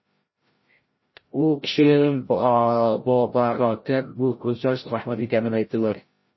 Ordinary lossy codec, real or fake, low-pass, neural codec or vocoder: MP3, 24 kbps; fake; 7.2 kHz; codec, 16 kHz, 0.5 kbps, FreqCodec, larger model